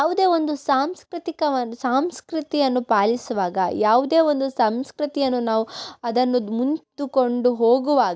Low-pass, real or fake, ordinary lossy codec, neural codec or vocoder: none; real; none; none